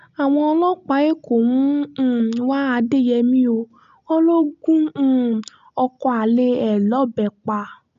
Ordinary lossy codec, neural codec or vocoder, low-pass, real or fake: none; none; 7.2 kHz; real